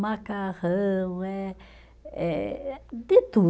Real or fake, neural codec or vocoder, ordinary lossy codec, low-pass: real; none; none; none